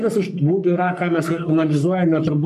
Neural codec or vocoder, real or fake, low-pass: codec, 44.1 kHz, 3.4 kbps, Pupu-Codec; fake; 14.4 kHz